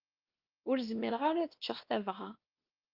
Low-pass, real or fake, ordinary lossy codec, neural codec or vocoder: 5.4 kHz; fake; Opus, 24 kbps; codec, 16 kHz, 2 kbps, X-Codec, WavLM features, trained on Multilingual LibriSpeech